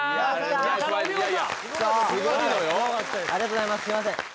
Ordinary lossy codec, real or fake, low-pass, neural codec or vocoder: none; real; none; none